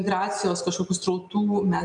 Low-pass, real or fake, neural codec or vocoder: 10.8 kHz; real; none